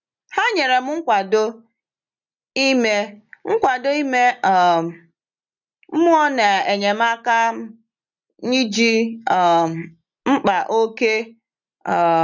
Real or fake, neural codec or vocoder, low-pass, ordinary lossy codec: real; none; 7.2 kHz; none